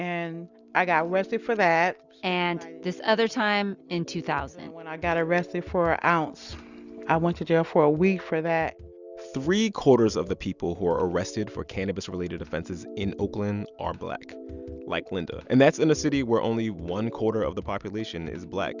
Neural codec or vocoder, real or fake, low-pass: none; real; 7.2 kHz